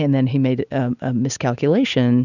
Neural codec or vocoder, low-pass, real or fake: none; 7.2 kHz; real